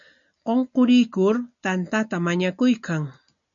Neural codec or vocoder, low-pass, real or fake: none; 7.2 kHz; real